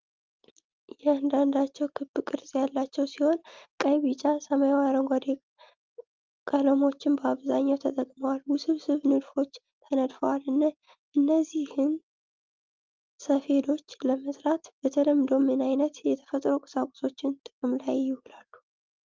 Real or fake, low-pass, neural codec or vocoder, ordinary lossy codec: real; 7.2 kHz; none; Opus, 24 kbps